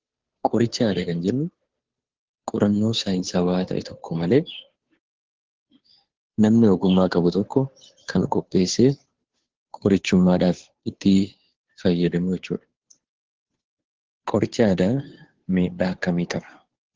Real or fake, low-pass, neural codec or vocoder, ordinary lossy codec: fake; 7.2 kHz; codec, 16 kHz, 2 kbps, FunCodec, trained on Chinese and English, 25 frames a second; Opus, 16 kbps